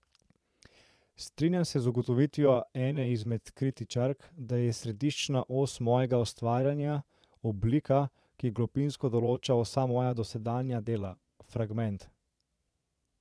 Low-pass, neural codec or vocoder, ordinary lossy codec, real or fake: none; vocoder, 22.05 kHz, 80 mel bands, Vocos; none; fake